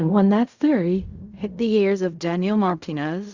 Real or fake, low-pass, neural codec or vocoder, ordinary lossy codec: fake; 7.2 kHz; codec, 16 kHz in and 24 kHz out, 0.4 kbps, LongCat-Audio-Codec, fine tuned four codebook decoder; Opus, 64 kbps